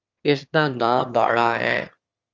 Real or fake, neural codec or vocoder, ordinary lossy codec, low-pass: fake; autoencoder, 22.05 kHz, a latent of 192 numbers a frame, VITS, trained on one speaker; Opus, 24 kbps; 7.2 kHz